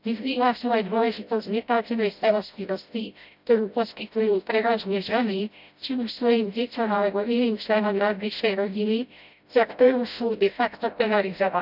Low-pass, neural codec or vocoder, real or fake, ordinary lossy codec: 5.4 kHz; codec, 16 kHz, 0.5 kbps, FreqCodec, smaller model; fake; none